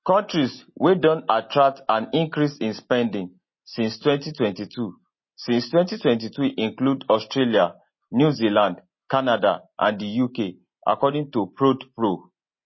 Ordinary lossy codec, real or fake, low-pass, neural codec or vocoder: MP3, 24 kbps; real; 7.2 kHz; none